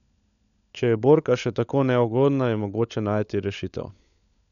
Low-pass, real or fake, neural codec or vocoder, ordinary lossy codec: 7.2 kHz; fake; codec, 16 kHz, 16 kbps, FunCodec, trained on LibriTTS, 50 frames a second; none